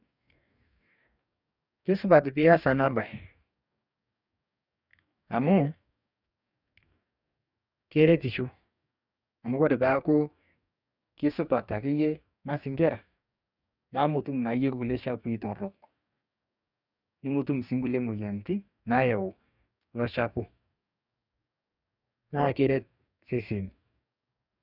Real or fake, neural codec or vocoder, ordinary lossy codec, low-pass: fake; codec, 44.1 kHz, 2.6 kbps, DAC; none; 5.4 kHz